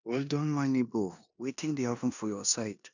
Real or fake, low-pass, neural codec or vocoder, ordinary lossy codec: fake; 7.2 kHz; codec, 16 kHz in and 24 kHz out, 0.9 kbps, LongCat-Audio-Codec, four codebook decoder; none